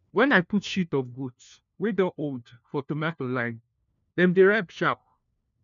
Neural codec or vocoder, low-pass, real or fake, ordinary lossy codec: codec, 16 kHz, 1 kbps, FunCodec, trained on LibriTTS, 50 frames a second; 7.2 kHz; fake; none